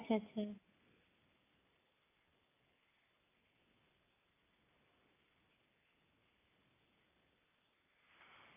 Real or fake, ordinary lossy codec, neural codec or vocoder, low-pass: real; AAC, 16 kbps; none; 3.6 kHz